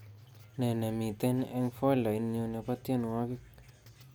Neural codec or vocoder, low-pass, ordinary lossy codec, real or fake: none; none; none; real